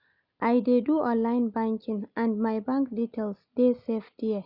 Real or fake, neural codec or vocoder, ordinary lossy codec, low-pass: real; none; none; 5.4 kHz